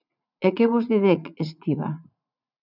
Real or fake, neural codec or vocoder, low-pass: fake; vocoder, 44.1 kHz, 80 mel bands, Vocos; 5.4 kHz